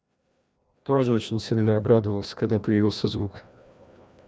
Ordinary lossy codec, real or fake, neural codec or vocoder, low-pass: none; fake; codec, 16 kHz, 1 kbps, FreqCodec, larger model; none